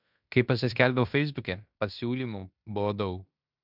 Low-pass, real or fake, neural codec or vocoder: 5.4 kHz; fake; codec, 16 kHz in and 24 kHz out, 0.9 kbps, LongCat-Audio-Codec, fine tuned four codebook decoder